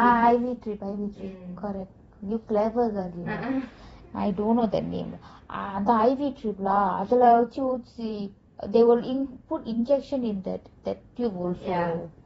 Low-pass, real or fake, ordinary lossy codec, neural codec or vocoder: 19.8 kHz; fake; AAC, 24 kbps; vocoder, 44.1 kHz, 128 mel bands every 512 samples, BigVGAN v2